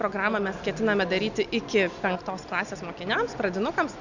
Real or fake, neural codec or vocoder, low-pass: real; none; 7.2 kHz